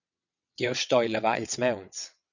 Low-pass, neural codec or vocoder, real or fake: 7.2 kHz; vocoder, 22.05 kHz, 80 mel bands, WaveNeXt; fake